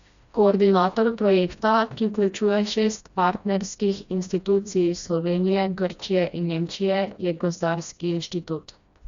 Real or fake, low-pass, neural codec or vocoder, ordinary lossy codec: fake; 7.2 kHz; codec, 16 kHz, 1 kbps, FreqCodec, smaller model; Opus, 64 kbps